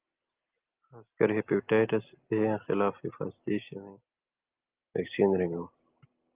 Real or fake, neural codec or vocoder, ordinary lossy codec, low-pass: real; none; Opus, 32 kbps; 3.6 kHz